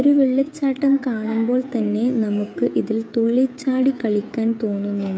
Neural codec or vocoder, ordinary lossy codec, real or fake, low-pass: codec, 16 kHz, 16 kbps, FreqCodec, smaller model; none; fake; none